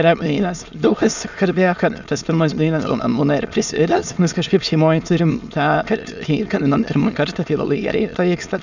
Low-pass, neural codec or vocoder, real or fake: 7.2 kHz; autoencoder, 22.05 kHz, a latent of 192 numbers a frame, VITS, trained on many speakers; fake